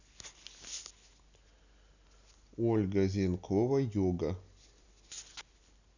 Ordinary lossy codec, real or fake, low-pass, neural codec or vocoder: none; real; 7.2 kHz; none